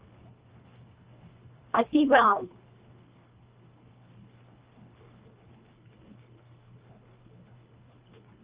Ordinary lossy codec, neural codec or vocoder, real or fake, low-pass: Opus, 24 kbps; codec, 24 kHz, 1.5 kbps, HILCodec; fake; 3.6 kHz